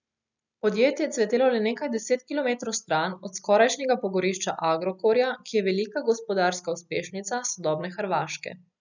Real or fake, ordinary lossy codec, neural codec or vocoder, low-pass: real; none; none; 7.2 kHz